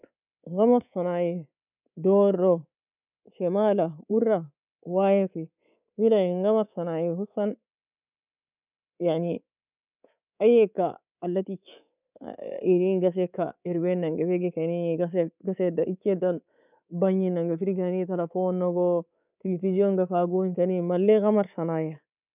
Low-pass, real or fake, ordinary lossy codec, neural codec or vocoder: 3.6 kHz; fake; none; codec, 24 kHz, 3.1 kbps, DualCodec